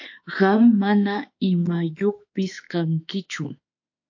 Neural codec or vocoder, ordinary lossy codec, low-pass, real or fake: autoencoder, 48 kHz, 32 numbers a frame, DAC-VAE, trained on Japanese speech; AAC, 48 kbps; 7.2 kHz; fake